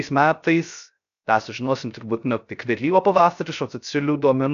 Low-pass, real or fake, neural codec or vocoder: 7.2 kHz; fake; codec, 16 kHz, 0.3 kbps, FocalCodec